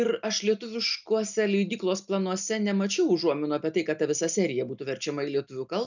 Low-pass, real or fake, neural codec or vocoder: 7.2 kHz; real; none